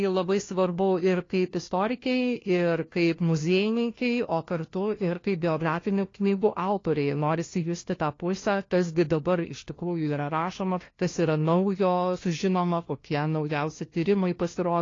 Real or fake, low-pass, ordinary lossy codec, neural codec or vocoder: fake; 7.2 kHz; AAC, 32 kbps; codec, 16 kHz, 1 kbps, FunCodec, trained on LibriTTS, 50 frames a second